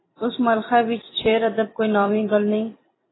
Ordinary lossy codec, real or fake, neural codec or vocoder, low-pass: AAC, 16 kbps; fake; vocoder, 44.1 kHz, 128 mel bands every 256 samples, BigVGAN v2; 7.2 kHz